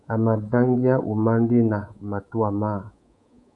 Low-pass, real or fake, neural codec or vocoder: 10.8 kHz; fake; codec, 24 kHz, 3.1 kbps, DualCodec